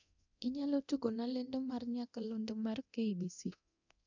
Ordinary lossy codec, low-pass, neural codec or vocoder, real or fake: none; 7.2 kHz; codec, 24 kHz, 0.9 kbps, DualCodec; fake